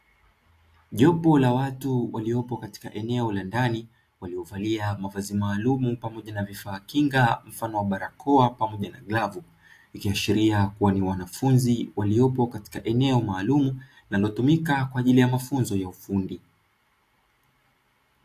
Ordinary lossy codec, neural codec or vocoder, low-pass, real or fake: AAC, 64 kbps; none; 14.4 kHz; real